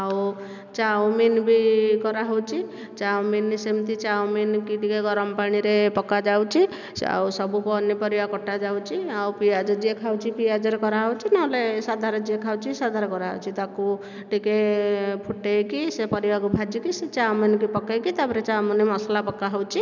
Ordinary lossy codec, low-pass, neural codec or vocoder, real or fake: none; 7.2 kHz; none; real